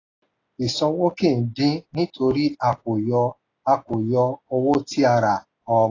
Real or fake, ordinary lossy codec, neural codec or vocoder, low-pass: real; AAC, 32 kbps; none; 7.2 kHz